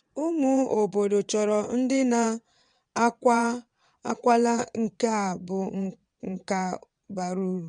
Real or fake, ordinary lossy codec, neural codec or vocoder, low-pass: fake; MP3, 64 kbps; vocoder, 22.05 kHz, 80 mel bands, WaveNeXt; 9.9 kHz